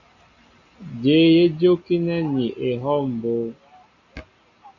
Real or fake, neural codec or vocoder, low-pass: real; none; 7.2 kHz